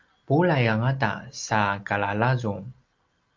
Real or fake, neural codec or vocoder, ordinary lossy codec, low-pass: real; none; Opus, 24 kbps; 7.2 kHz